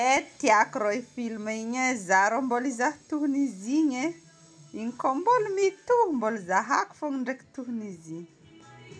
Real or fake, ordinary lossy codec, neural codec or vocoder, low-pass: real; none; none; none